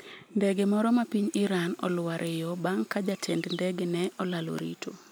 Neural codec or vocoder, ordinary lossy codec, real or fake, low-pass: none; none; real; none